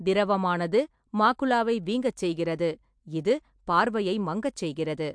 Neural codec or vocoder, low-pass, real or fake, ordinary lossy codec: none; 9.9 kHz; real; MP3, 64 kbps